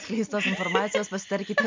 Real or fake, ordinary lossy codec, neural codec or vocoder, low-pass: real; MP3, 64 kbps; none; 7.2 kHz